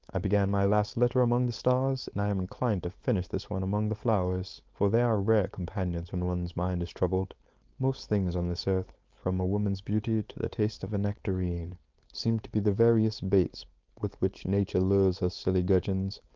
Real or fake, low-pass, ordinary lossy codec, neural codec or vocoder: fake; 7.2 kHz; Opus, 32 kbps; codec, 16 kHz, 4.8 kbps, FACodec